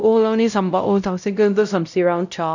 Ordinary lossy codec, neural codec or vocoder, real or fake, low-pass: none; codec, 16 kHz, 0.5 kbps, X-Codec, WavLM features, trained on Multilingual LibriSpeech; fake; 7.2 kHz